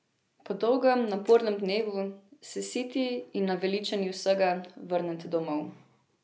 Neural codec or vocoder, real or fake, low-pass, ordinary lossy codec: none; real; none; none